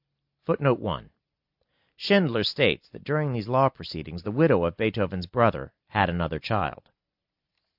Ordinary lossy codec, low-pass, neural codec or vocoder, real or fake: AAC, 48 kbps; 5.4 kHz; none; real